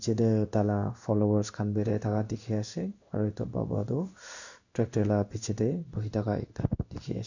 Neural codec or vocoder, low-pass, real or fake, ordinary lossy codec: codec, 16 kHz in and 24 kHz out, 1 kbps, XY-Tokenizer; 7.2 kHz; fake; none